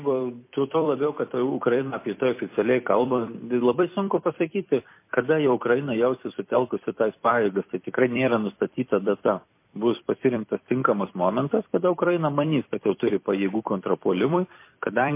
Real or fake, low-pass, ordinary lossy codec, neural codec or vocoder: fake; 3.6 kHz; MP3, 24 kbps; vocoder, 44.1 kHz, 128 mel bands every 256 samples, BigVGAN v2